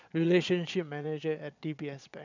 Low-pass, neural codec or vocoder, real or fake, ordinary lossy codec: 7.2 kHz; vocoder, 22.05 kHz, 80 mel bands, Vocos; fake; none